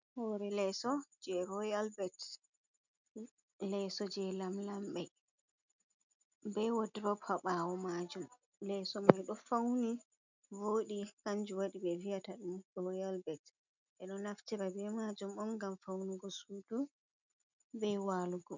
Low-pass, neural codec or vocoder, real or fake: 7.2 kHz; none; real